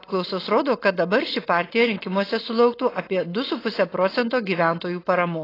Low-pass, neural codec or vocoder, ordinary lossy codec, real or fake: 5.4 kHz; none; AAC, 24 kbps; real